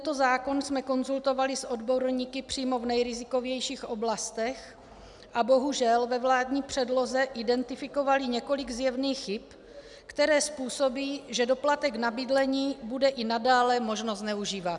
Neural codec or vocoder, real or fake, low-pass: none; real; 10.8 kHz